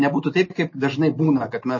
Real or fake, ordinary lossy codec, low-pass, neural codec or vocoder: real; MP3, 32 kbps; 7.2 kHz; none